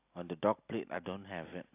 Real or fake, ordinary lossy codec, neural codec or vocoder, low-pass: real; AAC, 32 kbps; none; 3.6 kHz